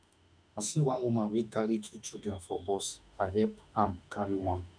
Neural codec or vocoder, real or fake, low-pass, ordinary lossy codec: autoencoder, 48 kHz, 32 numbers a frame, DAC-VAE, trained on Japanese speech; fake; 9.9 kHz; none